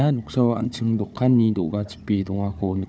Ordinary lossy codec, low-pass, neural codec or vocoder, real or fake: none; none; codec, 16 kHz, 4 kbps, FunCodec, trained on Chinese and English, 50 frames a second; fake